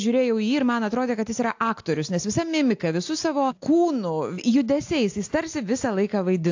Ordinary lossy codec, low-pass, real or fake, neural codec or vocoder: AAC, 48 kbps; 7.2 kHz; real; none